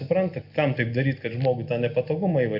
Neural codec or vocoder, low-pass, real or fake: none; 5.4 kHz; real